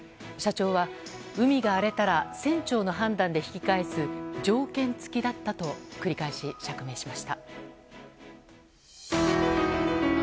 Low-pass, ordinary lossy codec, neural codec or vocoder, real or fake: none; none; none; real